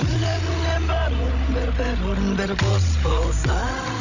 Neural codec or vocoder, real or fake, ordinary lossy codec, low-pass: codec, 16 kHz, 16 kbps, FreqCodec, larger model; fake; none; 7.2 kHz